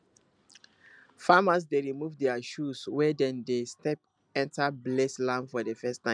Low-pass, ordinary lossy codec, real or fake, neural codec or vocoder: 9.9 kHz; MP3, 96 kbps; real; none